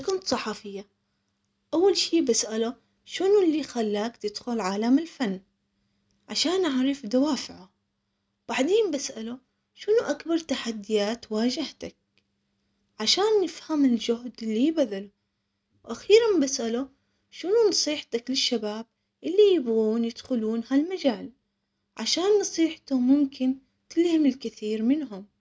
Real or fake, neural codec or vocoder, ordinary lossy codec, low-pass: real; none; none; none